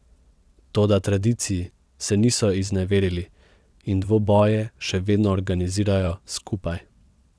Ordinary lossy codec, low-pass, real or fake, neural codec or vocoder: none; none; fake; vocoder, 22.05 kHz, 80 mel bands, WaveNeXt